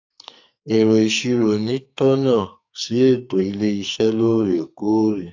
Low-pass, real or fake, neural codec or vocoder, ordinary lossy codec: 7.2 kHz; fake; codec, 44.1 kHz, 2.6 kbps, SNAC; AAC, 48 kbps